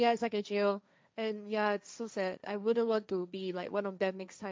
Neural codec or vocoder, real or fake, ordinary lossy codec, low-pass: codec, 16 kHz, 1.1 kbps, Voila-Tokenizer; fake; none; none